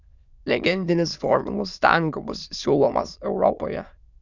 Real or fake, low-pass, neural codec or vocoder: fake; 7.2 kHz; autoencoder, 22.05 kHz, a latent of 192 numbers a frame, VITS, trained on many speakers